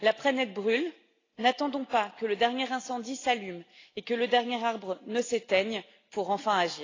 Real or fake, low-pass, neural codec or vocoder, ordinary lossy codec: real; 7.2 kHz; none; AAC, 32 kbps